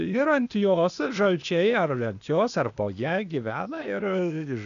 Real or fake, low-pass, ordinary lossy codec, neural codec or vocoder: fake; 7.2 kHz; MP3, 96 kbps; codec, 16 kHz, 0.8 kbps, ZipCodec